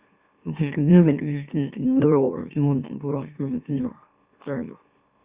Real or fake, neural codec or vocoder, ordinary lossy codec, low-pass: fake; autoencoder, 44.1 kHz, a latent of 192 numbers a frame, MeloTTS; Opus, 64 kbps; 3.6 kHz